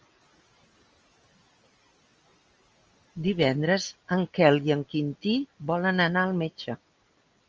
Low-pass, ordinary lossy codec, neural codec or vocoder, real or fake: 7.2 kHz; Opus, 24 kbps; none; real